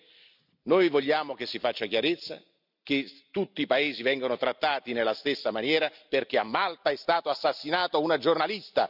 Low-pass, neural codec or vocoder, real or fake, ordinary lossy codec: 5.4 kHz; none; real; none